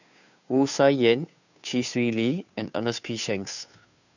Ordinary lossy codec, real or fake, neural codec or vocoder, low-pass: none; fake; codec, 16 kHz, 2 kbps, FunCodec, trained on Chinese and English, 25 frames a second; 7.2 kHz